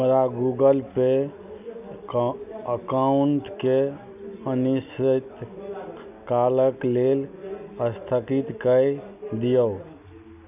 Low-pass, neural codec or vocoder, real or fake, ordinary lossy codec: 3.6 kHz; none; real; none